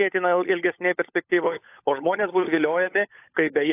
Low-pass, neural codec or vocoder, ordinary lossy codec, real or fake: 3.6 kHz; codec, 16 kHz, 16 kbps, FunCodec, trained on LibriTTS, 50 frames a second; AAC, 24 kbps; fake